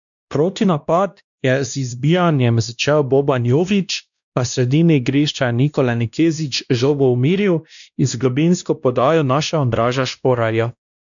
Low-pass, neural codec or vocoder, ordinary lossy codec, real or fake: 7.2 kHz; codec, 16 kHz, 1 kbps, X-Codec, WavLM features, trained on Multilingual LibriSpeech; none; fake